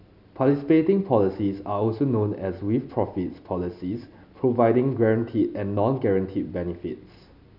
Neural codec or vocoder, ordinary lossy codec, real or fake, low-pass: none; none; real; 5.4 kHz